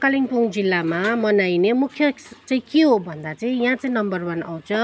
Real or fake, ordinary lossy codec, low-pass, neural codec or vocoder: real; none; none; none